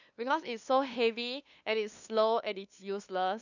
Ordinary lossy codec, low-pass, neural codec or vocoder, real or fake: none; 7.2 kHz; codec, 16 kHz, 2 kbps, FunCodec, trained on LibriTTS, 25 frames a second; fake